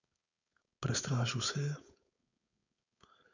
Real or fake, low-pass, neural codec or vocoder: fake; 7.2 kHz; codec, 16 kHz, 4.8 kbps, FACodec